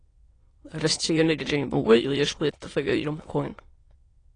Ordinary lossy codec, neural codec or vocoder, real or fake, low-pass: AAC, 32 kbps; autoencoder, 22.05 kHz, a latent of 192 numbers a frame, VITS, trained on many speakers; fake; 9.9 kHz